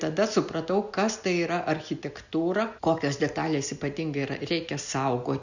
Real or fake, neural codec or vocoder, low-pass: real; none; 7.2 kHz